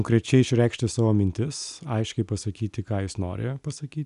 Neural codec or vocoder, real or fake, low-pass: none; real; 10.8 kHz